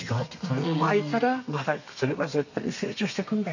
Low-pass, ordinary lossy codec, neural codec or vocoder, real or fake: 7.2 kHz; none; codec, 32 kHz, 1.9 kbps, SNAC; fake